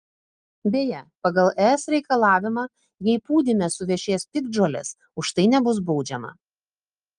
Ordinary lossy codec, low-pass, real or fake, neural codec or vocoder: Opus, 32 kbps; 9.9 kHz; real; none